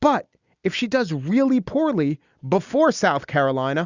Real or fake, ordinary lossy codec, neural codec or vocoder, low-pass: real; Opus, 64 kbps; none; 7.2 kHz